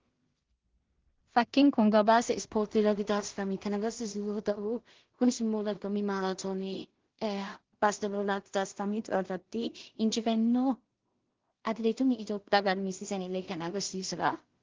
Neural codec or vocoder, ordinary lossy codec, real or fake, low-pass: codec, 16 kHz in and 24 kHz out, 0.4 kbps, LongCat-Audio-Codec, two codebook decoder; Opus, 16 kbps; fake; 7.2 kHz